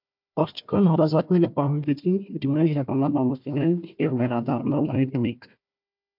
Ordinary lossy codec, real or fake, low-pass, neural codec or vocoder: MP3, 48 kbps; fake; 5.4 kHz; codec, 16 kHz, 1 kbps, FunCodec, trained on Chinese and English, 50 frames a second